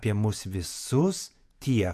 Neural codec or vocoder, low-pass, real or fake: vocoder, 48 kHz, 128 mel bands, Vocos; 14.4 kHz; fake